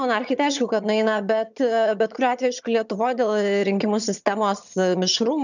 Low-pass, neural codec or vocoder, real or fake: 7.2 kHz; vocoder, 22.05 kHz, 80 mel bands, HiFi-GAN; fake